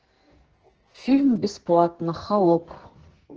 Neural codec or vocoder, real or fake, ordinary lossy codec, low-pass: codec, 44.1 kHz, 2.6 kbps, SNAC; fake; Opus, 16 kbps; 7.2 kHz